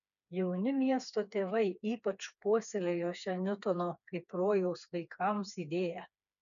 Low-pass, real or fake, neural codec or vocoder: 7.2 kHz; fake; codec, 16 kHz, 4 kbps, FreqCodec, smaller model